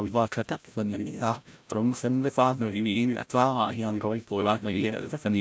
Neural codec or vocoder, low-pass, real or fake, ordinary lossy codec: codec, 16 kHz, 0.5 kbps, FreqCodec, larger model; none; fake; none